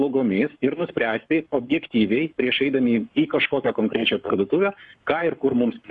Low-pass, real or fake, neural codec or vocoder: 10.8 kHz; fake; codec, 44.1 kHz, 7.8 kbps, Pupu-Codec